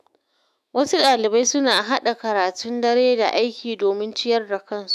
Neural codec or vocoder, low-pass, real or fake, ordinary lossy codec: autoencoder, 48 kHz, 128 numbers a frame, DAC-VAE, trained on Japanese speech; 14.4 kHz; fake; none